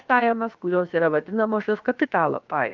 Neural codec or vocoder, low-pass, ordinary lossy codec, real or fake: codec, 16 kHz, about 1 kbps, DyCAST, with the encoder's durations; 7.2 kHz; Opus, 24 kbps; fake